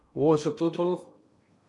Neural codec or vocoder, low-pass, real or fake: codec, 16 kHz in and 24 kHz out, 0.6 kbps, FocalCodec, streaming, 2048 codes; 10.8 kHz; fake